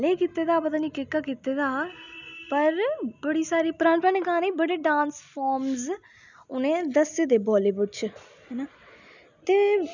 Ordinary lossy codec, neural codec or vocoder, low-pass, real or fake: none; none; 7.2 kHz; real